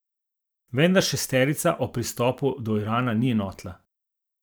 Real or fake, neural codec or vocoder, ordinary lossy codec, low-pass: fake; vocoder, 44.1 kHz, 128 mel bands every 512 samples, BigVGAN v2; none; none